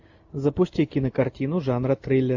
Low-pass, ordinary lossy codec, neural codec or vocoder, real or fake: 7.2 kHz; AAC, 48 kbps; none; real